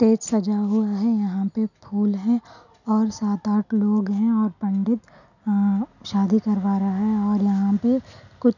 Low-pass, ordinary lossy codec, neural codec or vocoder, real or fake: 7.2 kHz; none; none; real